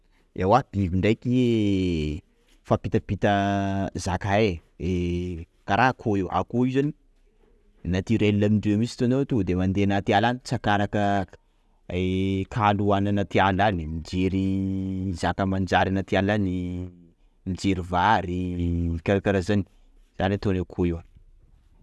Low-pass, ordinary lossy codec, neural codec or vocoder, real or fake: none; none; none; real